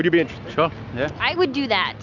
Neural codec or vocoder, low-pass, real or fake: none; 7.2 kHz; real